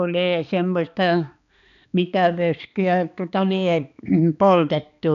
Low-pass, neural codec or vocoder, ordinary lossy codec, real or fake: 7.2 kHz; codec, 16 kHz, 2 kbps, X-Codec, HuBERT features, trained on balanced general audio; none; fake